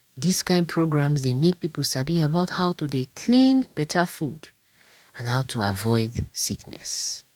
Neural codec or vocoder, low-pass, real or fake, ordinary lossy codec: codec, 44.1 kHz, 2.6 kbps, DAC; 19.8 kHz; fake; none